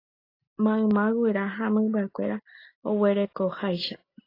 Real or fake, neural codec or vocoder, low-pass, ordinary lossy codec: real; none; 5.4 kHz; AAC, 32 kbps